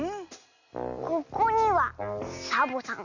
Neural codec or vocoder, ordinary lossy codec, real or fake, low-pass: none; none; real; 7.2 kHz